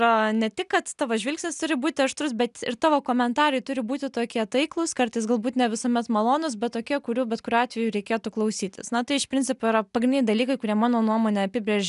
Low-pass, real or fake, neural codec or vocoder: 10.8 kHz; real; none